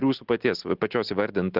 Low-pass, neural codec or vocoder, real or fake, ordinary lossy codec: 5.4 kHz; none; real; Opus, 16 kbps